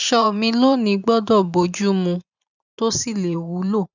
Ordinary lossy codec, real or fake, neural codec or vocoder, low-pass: none; fake; vocoder, 44.1 kHz, 128 mel bands every 512 samples, BigVGAN v2; 7.2 kHz